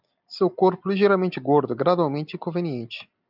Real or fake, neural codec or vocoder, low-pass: real; none; 5.4 kHz